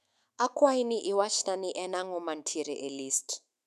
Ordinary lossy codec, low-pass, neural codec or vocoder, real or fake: none; 14.4 kHz; autoencoder, 48 kHz, 128 numbers a frame, DAC-VAE, trained on Japanese speech; fake